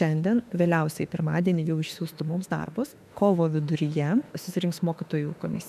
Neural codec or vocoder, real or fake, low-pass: autoencoder, 48 kHz, 32 numbers a frame, DAC-VAE, trained on Japanese speech; fake; 14.4 kHz